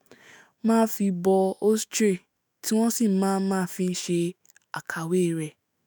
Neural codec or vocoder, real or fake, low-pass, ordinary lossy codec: autoencoder, 48 kHz, 128 numbers a frame, DAC-VAE, trained on Japanese speech; fake; none; none